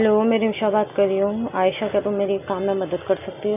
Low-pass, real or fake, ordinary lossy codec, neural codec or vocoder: 3.6 kHz; real; none; none